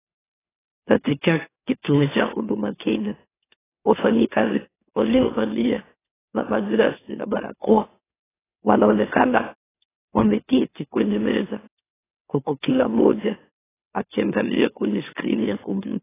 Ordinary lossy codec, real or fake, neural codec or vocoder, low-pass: AAC, 16 kbps; fake; autoencoder, 44.1 kHz, a latent of 192 numbers a frame, MeloTTS; 3.6 kHz